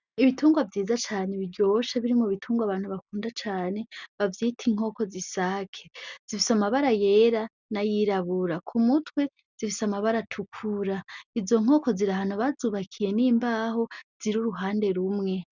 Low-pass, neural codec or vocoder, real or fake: 7.2 kHz; none; real